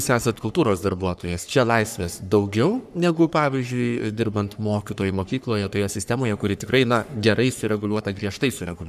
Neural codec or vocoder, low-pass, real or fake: codec, 44.1 kHz, 3.4 kbps, Pupu-Codec; 14.4 kHz; fake